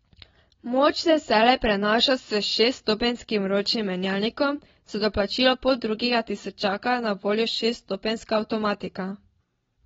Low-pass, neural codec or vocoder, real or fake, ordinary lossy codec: 7.2 kHz; none; real; AAC, 24 kbps